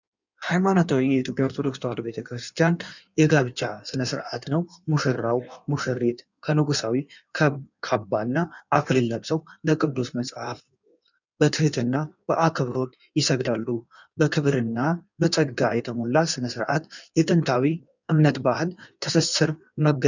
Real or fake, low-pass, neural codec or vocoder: fake; 7.2 kHz; codec, 16 kHz in and 24 kHz out, 1.1 kbps, FireRedTTS-2 codec